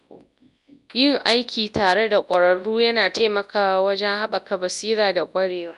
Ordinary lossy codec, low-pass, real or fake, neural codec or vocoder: none; 10.8 kHz; fake; codec, 24 kHz, 0.9 kbps, WavTokenizer, large speech release